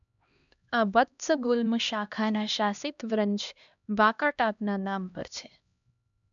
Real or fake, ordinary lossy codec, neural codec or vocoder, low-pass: fake; none; codec, 16 kHz, 1 kbps, X-Codec, HuBERT features, trained on LibriSpeech; 7.2 kHz